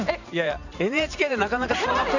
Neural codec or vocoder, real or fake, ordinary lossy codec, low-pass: vocoder, 44.1 kHz, 128 mel bands every 512 samples, BigVGAN v2; fake; none; 7.2 kHz